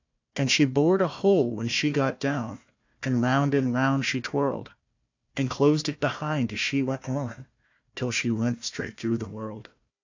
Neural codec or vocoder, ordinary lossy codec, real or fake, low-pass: codec, 16 kHz, 1 kbps, FunCodec, trained on Chinese and English, 50 frames a second; AAC, 48 kbps; fake; 7.2 kHz